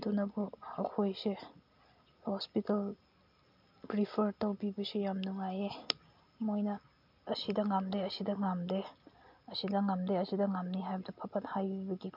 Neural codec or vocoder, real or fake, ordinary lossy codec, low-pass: none; real; MP3, 48 kbps; 5.4 kHz